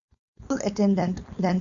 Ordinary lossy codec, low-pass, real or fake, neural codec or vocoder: Opus, 64 kbps; 7.2 kHz; fake; codec, 16 kHz, 4.8 kbps, FACodec